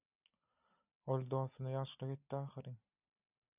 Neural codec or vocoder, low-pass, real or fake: none; 3.6 kHz; real